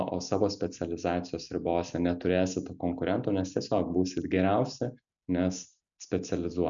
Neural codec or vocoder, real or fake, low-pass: none; real; 7.2 kHz